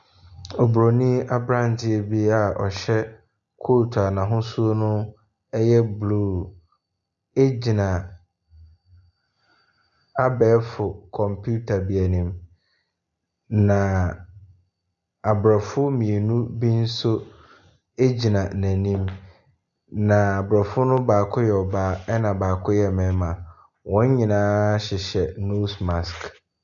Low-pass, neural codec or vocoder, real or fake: 7.2 kHz; none; real